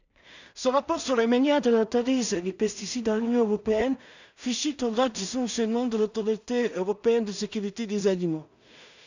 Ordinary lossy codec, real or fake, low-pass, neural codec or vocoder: none; fake; 7.2 kHz; codec, 16 kHz in and 24 kHz out, 0.4 kbps, LongCat-Audio-Codec, two codebook decoder